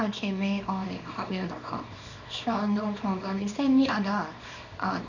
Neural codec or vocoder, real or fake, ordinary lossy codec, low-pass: codec, 24 kHz, 0.9 kbps, WavTokenizer, small release; fake; none; 7.2 kHz